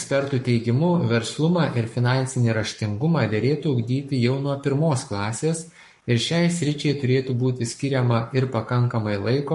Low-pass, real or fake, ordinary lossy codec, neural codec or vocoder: 14.4 kHz; fake; MP3, 48 kbps; codec, 44.1 kHz, 7.8 kbps, Pupu-Codec